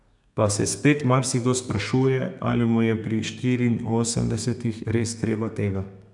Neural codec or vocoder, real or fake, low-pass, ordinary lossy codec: codec, 32 kHz, 1.9 kbps, SNAC; fake; 10.8 kHz; none